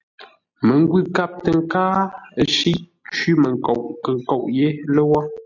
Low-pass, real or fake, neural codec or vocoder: 7.2 kHz; real; none